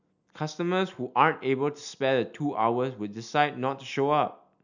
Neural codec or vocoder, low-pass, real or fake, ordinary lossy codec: none; 7.2 kHz; real; none